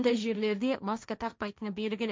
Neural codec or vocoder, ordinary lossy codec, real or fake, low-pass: codec, 16 kHz, 1.1 kbps, Voila-Tokenizer; none; fake; none